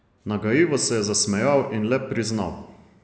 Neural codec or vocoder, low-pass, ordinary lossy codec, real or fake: none; none; none; real